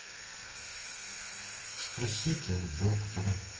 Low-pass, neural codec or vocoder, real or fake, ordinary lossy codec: 7.2 kHz; codec, 32 kHz, 1.9 kbps, SNAC; fake; Opus, 24 kbps